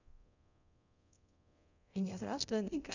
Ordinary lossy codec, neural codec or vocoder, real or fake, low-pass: none; codec, 24 kHz, 0.9 kbps, DualCodec; fake; 7.2 kHz